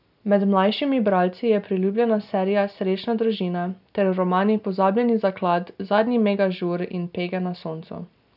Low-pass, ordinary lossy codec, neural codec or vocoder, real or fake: 5.4 kHz; none; none; real